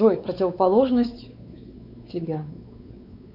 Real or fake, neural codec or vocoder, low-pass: fake; codec, 16 kHz, 4 kbps, X-Codec, WavLM features, trained on Multilingual LibriSpeech; 5.4 kHz